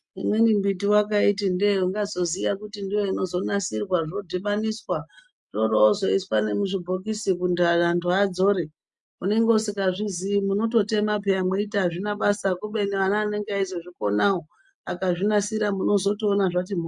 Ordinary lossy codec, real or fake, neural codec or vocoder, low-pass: MP3, 64 kbps; real; none; 10.8 kHz